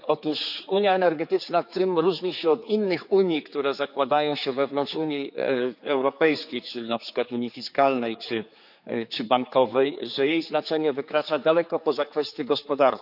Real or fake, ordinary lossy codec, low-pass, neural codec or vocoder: fake; none; 5.4 kHz; codec, 16 kHz, 4 kbps, X-Codec, HuBERT features, trained on general audio